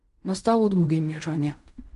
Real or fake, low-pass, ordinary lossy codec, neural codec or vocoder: fake; 10.8 kHz; MP3, 64 kbps; codec, 16 kHz in and 24 kHz out, 0.4 kbps, LongCat-Audio-Codec, fine tuned four codebook decoder